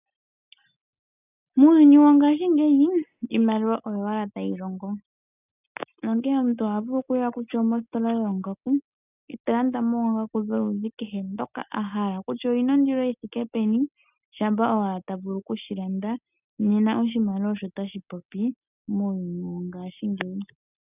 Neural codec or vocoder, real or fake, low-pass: none; real; 3.6 kHz